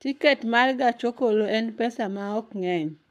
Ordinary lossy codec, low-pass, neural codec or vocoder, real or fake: AAC, 96 kbps; 14.4 kHz; codec, 44.1 kHz, 7.8 kbps, Pupu-Codec; fake